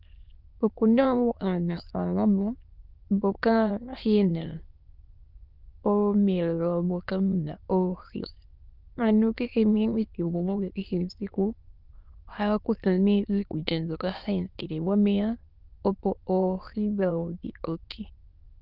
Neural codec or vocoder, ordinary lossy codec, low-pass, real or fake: autoencoder, 22.05 kHz, a latent of 192 numbers a frame, VITS, trained on many speakers; Opus, 24 kbps; 5.4 kHz; fake